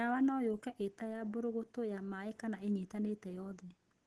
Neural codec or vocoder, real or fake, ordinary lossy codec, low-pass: none; real; Opus, 16 kbps; 10.8 kHz